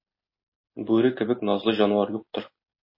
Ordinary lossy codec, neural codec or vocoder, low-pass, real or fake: MP3, 24 kbps; none; 5.4 kHz; real